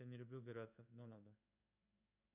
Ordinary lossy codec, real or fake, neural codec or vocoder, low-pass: MP3, 32 kbps; fake; codec, 16 kHz in and 24 kHz out, 1 kbps, XY-Tokenizer; 3.6 kHz